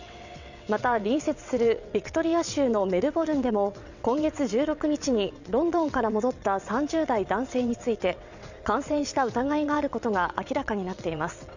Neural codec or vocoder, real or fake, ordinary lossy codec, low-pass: vocoder, 22.05 kHz, 80 mel bands, WaveNeXt; fake; none; 7.2 kHz